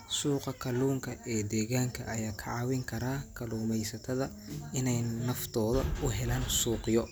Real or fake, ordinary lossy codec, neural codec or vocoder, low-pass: real; none; none; none